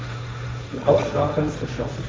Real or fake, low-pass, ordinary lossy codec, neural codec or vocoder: fake; none; none; codec, 16 kHz, 1.1 kbps, Voila-Tokenizer